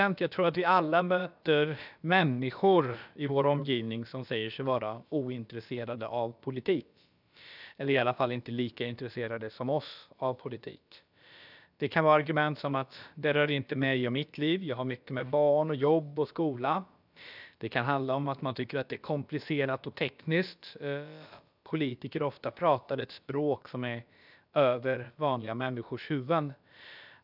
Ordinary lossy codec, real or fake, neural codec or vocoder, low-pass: none; fake; codec, 16 kHz, about 1 kbps, DyCAST, with the encoder's durations; 5.4 kHz